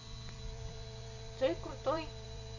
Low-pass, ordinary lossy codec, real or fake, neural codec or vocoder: 7.2 kHz; none; fake; vocoder, 44.1 kHz, 128 mel bands every 256 samples, BigVGAN v2